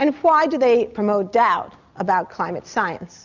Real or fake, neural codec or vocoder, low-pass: real; none; 7.2 kHz